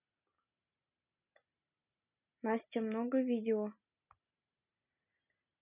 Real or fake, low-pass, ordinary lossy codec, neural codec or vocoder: real; 3.6 kHz; none; none